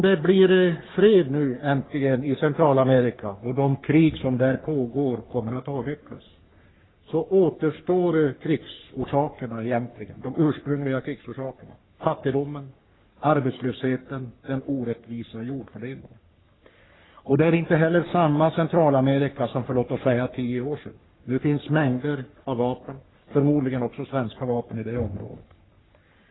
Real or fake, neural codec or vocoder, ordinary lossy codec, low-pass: fake; codec, 44.1 kHz, 3.4 kbps, Pupu-Codec; AAC, 16 kbps; 7.2 kHz